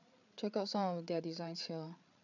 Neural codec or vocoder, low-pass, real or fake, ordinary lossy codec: codec, 16 kHz, 8 kbps, FreqCodec, larger model; 7.2 kHz; fake; none